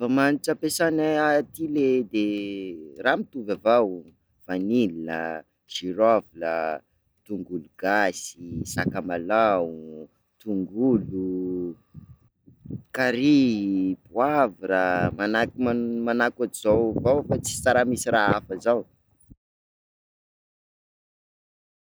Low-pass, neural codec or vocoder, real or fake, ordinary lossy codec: none; none; real; none